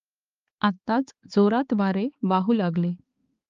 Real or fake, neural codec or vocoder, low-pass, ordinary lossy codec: fake; codec, 16 kHz, 4 kbps, X-Codec, HuBERT features, trained on balanced general audio; 7.2 kHz; Opus, 32 kbps